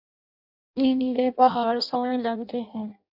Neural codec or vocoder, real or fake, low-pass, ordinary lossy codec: codec, 16 kHz in and 24 kHz out, 0.6 kbps, FireRedTTS-2 codec; fake; 5.4 kHz; AAC, 48 kbps